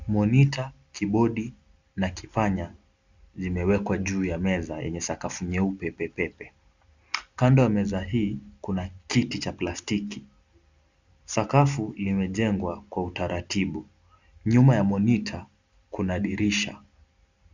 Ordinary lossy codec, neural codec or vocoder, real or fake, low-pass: Opus, 64 kbps; none; real; 7.2 kHz